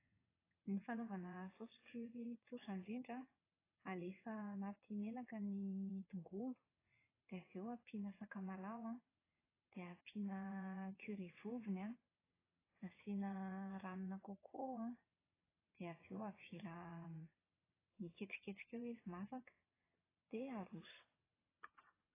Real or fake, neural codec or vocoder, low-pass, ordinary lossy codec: fake; vocoder, 22.05 kHz, 80 mel bands, WaveNeXt; 3.6 kHz; AAC, 16 kbps